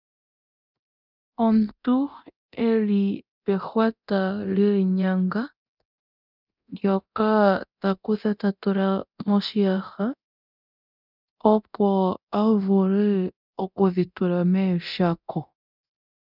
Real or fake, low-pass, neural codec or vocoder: fake; 5.4 kHz; codec, 24 kHz, 0.9 kbps, DualCodec